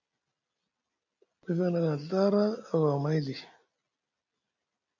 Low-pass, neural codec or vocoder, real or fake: 7.2 kHz; none; real